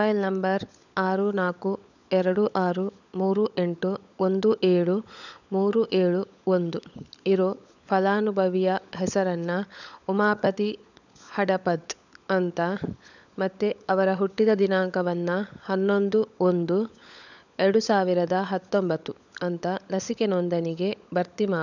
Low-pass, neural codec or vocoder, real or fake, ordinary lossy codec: 7.2 kHz; codec, 16 kHz, 16 kbps, FunCodec, trained on LibriTTS, 50 frames a second; fake; none